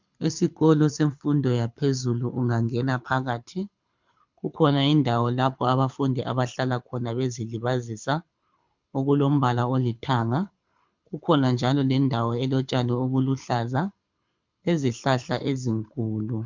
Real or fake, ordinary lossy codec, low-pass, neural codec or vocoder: fake; MP3, 64 kbps; 7.2 kHz; codec, 24 kHz, 6 kbps, HILCodec